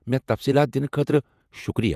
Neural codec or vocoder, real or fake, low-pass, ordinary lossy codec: vocoder, 48 kHz, 128 mel bands, Vocos; fake; 14.4 kHz; none